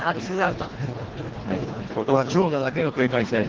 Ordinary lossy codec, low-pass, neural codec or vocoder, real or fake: Opus, 16 kbps; 7.2 kHz; codec, 24 kHz, 1.5 kbps, HILCodec; fake